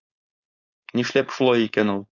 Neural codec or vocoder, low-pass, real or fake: codec, 16 kHz, 4.8 kbps, FACodec; 7.2 kHz; fake